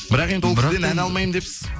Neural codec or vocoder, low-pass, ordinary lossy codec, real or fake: none; none; none; real